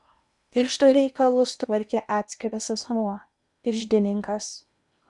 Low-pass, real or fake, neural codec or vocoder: 10.8 kHz; fake; codec, 16 kHz in and 24 kHz out, 0.8 kbps, FocalCodec, streaming, 65536 codes